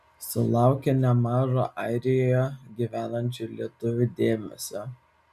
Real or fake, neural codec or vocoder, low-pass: real; none; 14.4 kHz